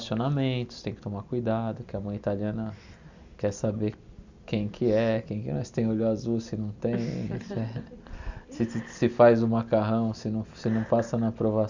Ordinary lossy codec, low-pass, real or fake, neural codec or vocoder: Opus, 64 kbps; 7.2 kHz; real; none